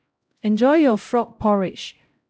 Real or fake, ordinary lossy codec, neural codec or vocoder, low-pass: fake; none; codec, 16 kHz, 0.5 kbps, X-Codec, HuBERT features, trained on LibriSpeech; none